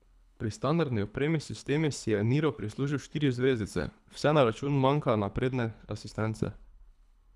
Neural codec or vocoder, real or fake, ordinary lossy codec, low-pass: codec, 24 kHz, 3 kbps, HILCodec; fake; none; none